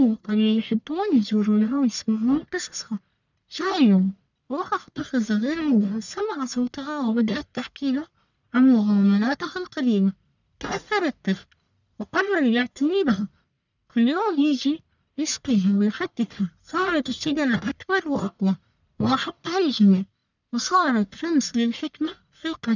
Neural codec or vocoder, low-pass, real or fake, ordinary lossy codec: codec, 44.1 kHz, 1.7 kbps, Pupu-Codec; 7.2 kHz; fake; none